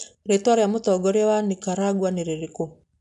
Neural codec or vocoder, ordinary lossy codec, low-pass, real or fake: none; none; 10.8 kHz; real